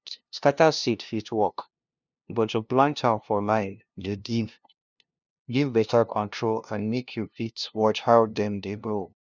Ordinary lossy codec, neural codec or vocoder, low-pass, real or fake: none; codec, 16 kHz, 0.5 kbps, FunCodec, trained on LibriTTS, 25 frames a second; 7.2 kHz; fake